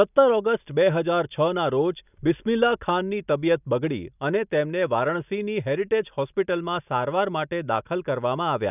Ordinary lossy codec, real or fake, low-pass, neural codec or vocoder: none; real; 3.6 kHz; none